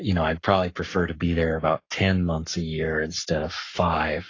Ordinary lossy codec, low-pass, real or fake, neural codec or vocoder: AAC, 32 kbps; 7.2 kHz; fake; codec, 44.1 kHz, 7.8 kbps, Pupu-Codec